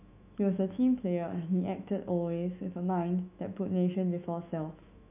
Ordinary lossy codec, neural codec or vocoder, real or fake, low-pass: none; autoencoder, 48 kHz, 128 numbers a frame, DAC-VAE, trained on Japanese speech; fake; 3.6 kHz